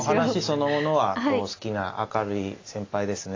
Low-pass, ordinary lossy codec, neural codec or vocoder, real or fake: 7.2 kHz; AAC, 32 kbps; none; real